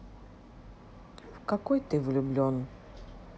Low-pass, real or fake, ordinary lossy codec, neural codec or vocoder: none; real; none; none